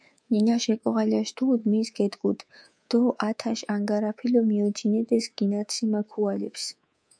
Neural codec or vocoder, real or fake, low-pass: codec, 24 kHz, 3.1 kbps, DualCodec; fake; 9.9 kHz